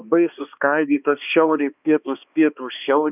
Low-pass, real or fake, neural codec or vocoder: 3.6 kHz; fake; codec, 16 kHz, 2 kbps, X-Codec, HuBERT features, trained on balanced general audio